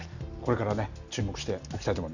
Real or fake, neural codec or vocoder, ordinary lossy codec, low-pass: real; none; none; 7.2 kHz